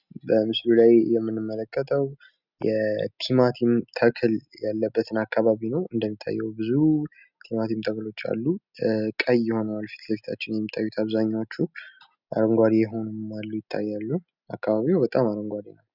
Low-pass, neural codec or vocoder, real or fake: 5.4 kHz; none; real